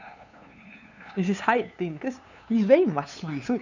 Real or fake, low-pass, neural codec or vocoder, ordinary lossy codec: fake; 7.2 kHz; codec, 16 kHz, 2 kbps, FunCodec, trained on LibriTTS, 25 frames a second; none